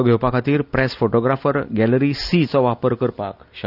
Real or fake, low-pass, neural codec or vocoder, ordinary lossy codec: real; 5.4 kHz; none; none